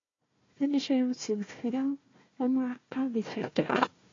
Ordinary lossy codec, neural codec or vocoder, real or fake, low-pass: AAC, 32 kbps; codec, 16 kHz, 1 kbps, FunCodec, trained on Chinese and English, 50 frames a second; fake; 7.2 kHz